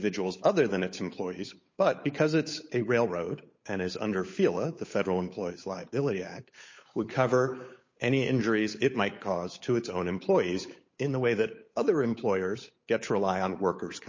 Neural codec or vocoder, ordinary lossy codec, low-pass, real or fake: codec, 16 kHz, 8 kbps, FunCodec, trained on Chinese and English, 25 frames a second; MP3, 32 kbps; 7.2 kHz; fake